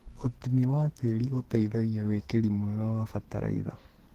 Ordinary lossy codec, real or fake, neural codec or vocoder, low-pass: Opus, 16 kbps; fake; codec, 44.1 kHz, 2.6 kbps, SNAC; 14.4 kHz